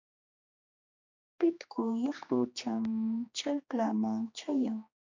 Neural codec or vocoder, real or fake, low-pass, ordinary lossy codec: codec, 16 kHz, 2 kbps, X-Codec, HuBERT features, trained on general audio; fake; 7.2 kHz; MP3, 48 kbps